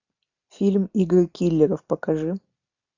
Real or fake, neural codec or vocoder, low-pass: real; none; 7.2 kHz